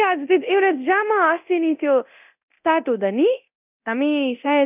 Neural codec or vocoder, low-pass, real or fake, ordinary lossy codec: codec, 24 kHz, 0.9 kbps, DualCodec; 3.6 kHz; fake; none